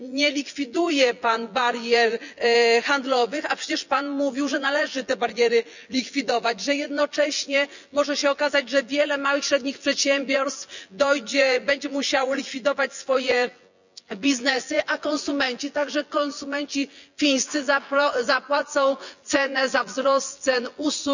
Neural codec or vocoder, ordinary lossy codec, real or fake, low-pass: vocoder, 24 kHz, 100 mel bands, Vocos; none; fake; 7.2 kHz